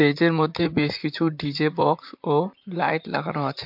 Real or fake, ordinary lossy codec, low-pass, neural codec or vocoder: fake; MP3, 48 kbps; 5.4 kHz; vocoder, 44.1 kHz, 128 mel bands, Pupu-Vocoder